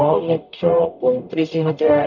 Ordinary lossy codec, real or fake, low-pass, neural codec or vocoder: none; fake; 7.2 kHz; codec, 44.1 kHz, 0.9 kbps, DAC